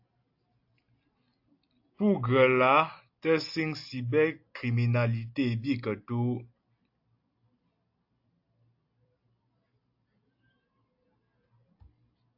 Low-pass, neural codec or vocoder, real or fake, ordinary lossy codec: 5.4 kHz; none; real; AAC, 48 kbps